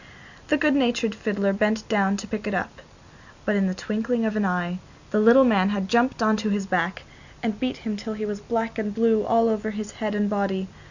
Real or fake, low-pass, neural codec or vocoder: real; 7.2 kHz; none